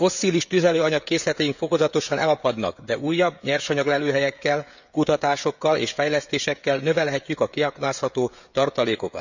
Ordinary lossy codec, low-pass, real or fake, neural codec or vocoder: none; 7.2 kHz; fake; codec, 16 kHz, 16 kbps, FreqCodec, smaller model